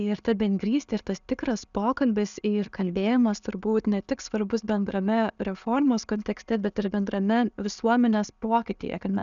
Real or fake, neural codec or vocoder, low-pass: real; none; 7.2 kHz